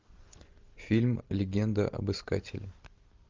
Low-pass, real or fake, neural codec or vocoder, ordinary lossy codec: 7.2 kHz; real; none; Opus, 24 kbps